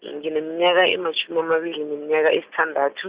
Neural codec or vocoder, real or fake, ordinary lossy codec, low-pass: none; real; Opus, 64 kbps; 3.6 kHz